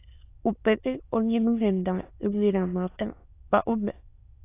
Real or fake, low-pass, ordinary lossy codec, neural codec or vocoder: fake; 3.6 kHz; AAC, 24 kbps; autoencoder, 22.05 kHz, a latent of 192 numbers a frame, VITS, trained on many speakers